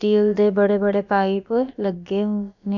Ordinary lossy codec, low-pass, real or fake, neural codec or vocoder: none; 7.2 kHz; fake; codec, 16 kHz, about 1 kbps, DyCAST, with the encoder's durations